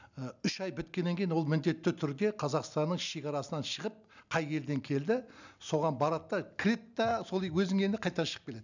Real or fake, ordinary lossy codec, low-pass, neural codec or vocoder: real; none; 7.2 kHz; none